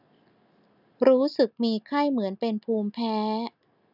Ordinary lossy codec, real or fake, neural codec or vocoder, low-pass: none; real; none; 5.4 kHz